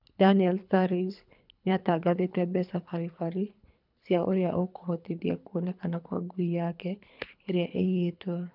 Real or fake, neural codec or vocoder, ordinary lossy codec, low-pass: fake; codec, 24 kHz, 3 kbps, HILCodec; none; 5.4 kHz